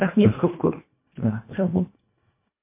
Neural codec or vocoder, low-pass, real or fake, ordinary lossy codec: codec, 24 kHz, 1.5 kbps, HILCodec; 3.6 kHz; fake; MP3, 24 kbps